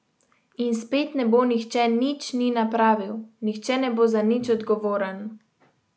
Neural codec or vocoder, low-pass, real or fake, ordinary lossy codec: none; none; real; none